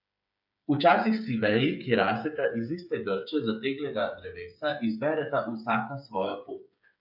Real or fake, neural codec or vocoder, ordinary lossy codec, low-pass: fake; codec, 16 kHz, 8 kbps, FreqCodec, smaller model; none; 5.4 kHz